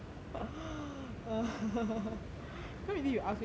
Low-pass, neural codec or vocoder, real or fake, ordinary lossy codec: none; none; real; none